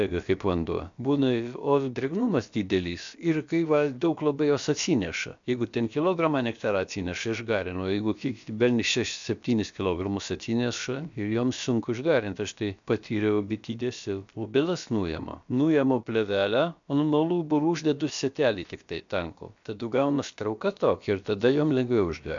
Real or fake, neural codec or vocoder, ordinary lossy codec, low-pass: fake; codec, 16 kHz, about 1 kbps, DyCAST, with the encoder's durations; MP3, 64 kbps; 7.2 kHz